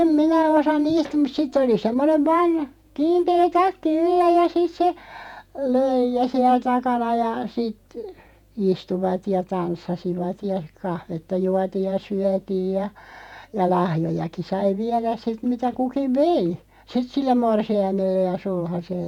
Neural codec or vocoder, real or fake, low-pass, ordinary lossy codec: vocoder, 48 kHz, 128 mel bands, Vocos; fake; 19.8 kHz; none